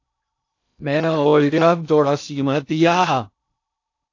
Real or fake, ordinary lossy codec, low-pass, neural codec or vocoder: fake; AAC, 48 kbps; 7.2 kHz; codec, 16 kHz in and 24 kHz out, 0.6 kbps, FocalCodec, streaming, 2048 codes